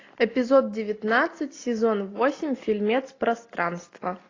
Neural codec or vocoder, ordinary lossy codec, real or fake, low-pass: none; AAC, 32 kbps; real; 7.2 kHz